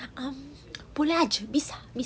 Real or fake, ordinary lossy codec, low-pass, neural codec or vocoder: real; none; none; none